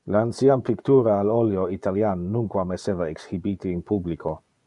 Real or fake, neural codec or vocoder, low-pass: fake; vocoder, 24 kHz, 100 mel bands, Vocos; 10.8 kHz